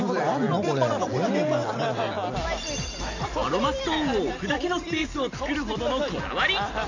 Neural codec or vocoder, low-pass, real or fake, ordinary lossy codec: none; 7.2 kHz; real; none